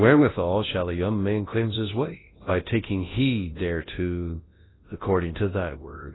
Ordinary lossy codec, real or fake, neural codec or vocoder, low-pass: AAC, 16 kbps; fake; codec, 24 kHz, 0.9 kbps, WavTokenizer, large speech release; 7.2 kHz